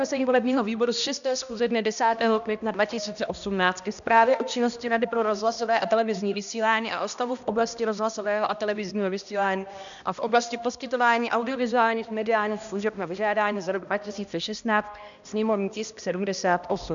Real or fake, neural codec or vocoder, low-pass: fake; codec, 16 kHz, 1 kbps, X-Codec, HuBERT features, trained on balanced general audio; 7.2 kHz